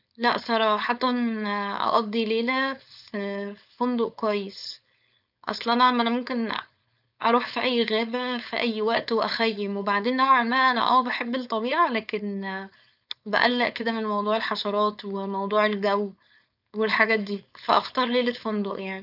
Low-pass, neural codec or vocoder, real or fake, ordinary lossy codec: 5.4 kHz; codec, 16 kHz, 4.8 kbps, FACodec; fake; none